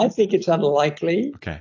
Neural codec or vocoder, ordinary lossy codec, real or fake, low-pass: none; AAC, 48 kbps; real; 7.2 kHz